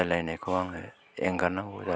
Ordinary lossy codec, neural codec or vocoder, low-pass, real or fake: none; none; none; real